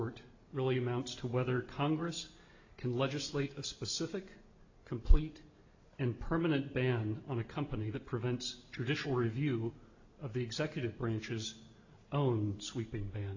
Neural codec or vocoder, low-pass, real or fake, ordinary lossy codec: none; 7.2 kHz; real; AAC, 48 kbps